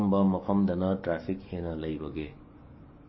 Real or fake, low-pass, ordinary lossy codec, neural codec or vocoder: fake; 7.2 kHz; MP3, 24 kbps; codec, 44.1 kHz, 7.8 kbps, DAC